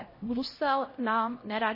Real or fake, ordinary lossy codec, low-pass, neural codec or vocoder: fake; MP3, 24 kbps; 5.4 kHz; codec, 16 kHz, 0.5 kbps, X-Codec, HuBERT features, trained on LibriSpeech